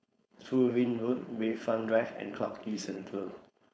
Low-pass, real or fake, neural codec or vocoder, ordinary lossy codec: none; fake; codec, 16 kHz, 4.8 kbps, FACodec; none